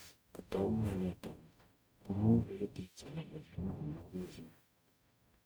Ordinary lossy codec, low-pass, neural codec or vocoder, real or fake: none; none; codec, 44.1 kHz, 0.9 kbps, DAC; fake